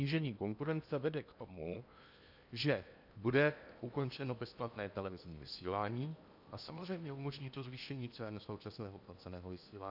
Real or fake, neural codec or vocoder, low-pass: fake; codec, 16 kHz in and 24 kHz out, 0.8 kbps, FocalCodec, streaming, 65536 codes; 5.4 kHz